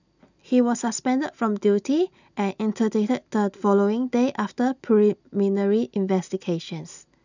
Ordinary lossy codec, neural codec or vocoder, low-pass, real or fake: none; none; 7.2 kHz; real